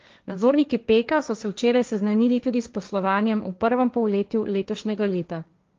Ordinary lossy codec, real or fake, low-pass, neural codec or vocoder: Opus, 32 kbps; fake; 7.2 kHz; codec, 16 kHz, 1.1 kbps, Voila-Tokenizer